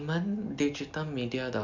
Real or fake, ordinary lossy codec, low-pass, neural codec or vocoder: real; none; 7.2 kHz; none